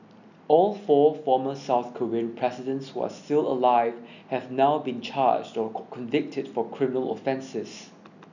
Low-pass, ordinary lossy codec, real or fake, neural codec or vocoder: 7.2 kHz; none; real; none